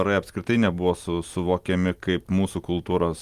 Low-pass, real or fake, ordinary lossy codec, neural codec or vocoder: 14.4 kHz; real; Opus, 32 kbps; none